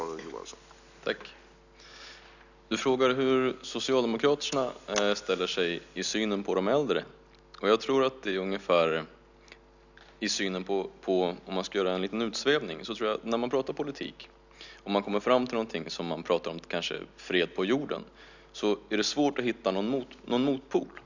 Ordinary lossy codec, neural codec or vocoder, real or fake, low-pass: none; none; real; 7.2 kHz